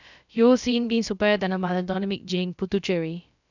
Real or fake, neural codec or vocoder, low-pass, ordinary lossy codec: fake; codec, 16 kHz, about 1 kbps, DyCAST, with the encoder's durations; 7.2 kHz; none